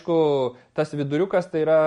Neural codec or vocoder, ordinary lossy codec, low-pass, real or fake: none; MP3, 48 kbps; 10.8 kHz; real